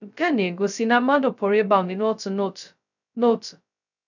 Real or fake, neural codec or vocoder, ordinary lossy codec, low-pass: fake; codec, 16 kHz, 0.2 kbps, FocalCodec; none; 7.2 kHz